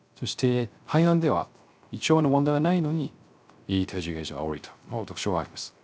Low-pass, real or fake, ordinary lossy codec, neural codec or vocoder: none; fake; none; codec, 16 kHz, 0.3 kbps, FocalCodec